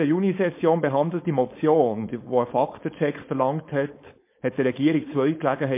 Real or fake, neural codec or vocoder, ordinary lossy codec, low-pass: fake; codec, 16 kHz, 4.8 kbps, FACodec; MP3, 24 kbps; 3.6 kHz